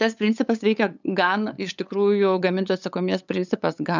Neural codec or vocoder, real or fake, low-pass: codec, 16 kHz, 8 kbps, FunCodec, trained on LibriTTS, 25 frames a second; fake; 7.2 kHz